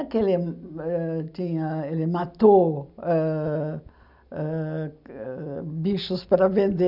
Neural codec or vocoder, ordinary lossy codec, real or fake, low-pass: none; none; real; 5.4 kHz